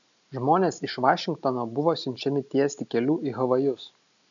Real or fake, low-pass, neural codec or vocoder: real; 7.2 kHz; none